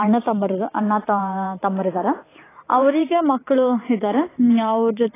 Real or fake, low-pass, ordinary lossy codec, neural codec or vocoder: fake; 3.6 kHz; AAC, 16 kbps; vocoder, 44.1 kHz, 128 mel bands every 512 samples, BigVGAN v2